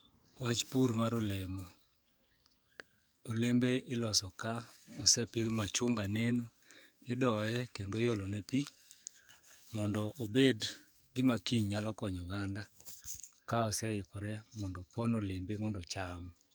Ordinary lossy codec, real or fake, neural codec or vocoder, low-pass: none; fake; codec, 44.1 kHz, 2.6 kbps, SNAC; none